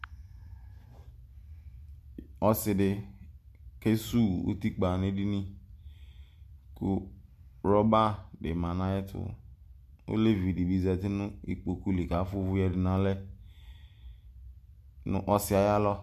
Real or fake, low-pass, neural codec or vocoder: real; 14.4 kHz; none